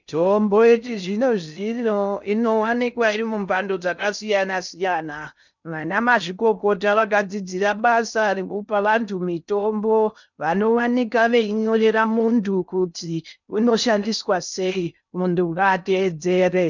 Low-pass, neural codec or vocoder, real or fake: 7.2 kHz; codec, 16 kHz in and 24 kHz out, 0.6 kbps, FocalCodec, streaming, 2048 codes; fake